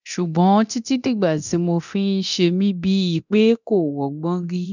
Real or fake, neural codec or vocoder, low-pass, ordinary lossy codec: fake; codec, 24 kHz, 0.9 kbps, DualCodec; 7.2 kHz; none